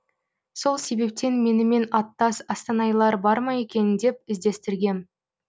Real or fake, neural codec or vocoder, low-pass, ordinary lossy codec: real; none; none; none